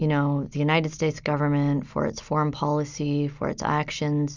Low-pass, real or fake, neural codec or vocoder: 7.2 kHz; real; none